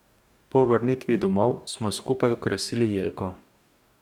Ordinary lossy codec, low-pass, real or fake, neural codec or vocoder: none; 19.8 kHz; fake; codec, 44.1 kHz, 2.6 kbps, DAC